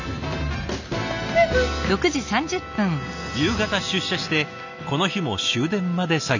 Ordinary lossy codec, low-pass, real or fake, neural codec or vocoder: none; 7.2 kHz; real; none